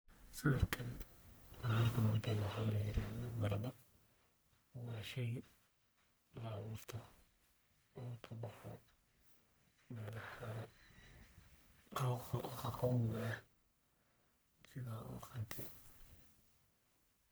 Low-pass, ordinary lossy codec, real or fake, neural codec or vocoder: none; none; fake; codec, 44.1 kHz, 1.7 kbps, Pupu-Codec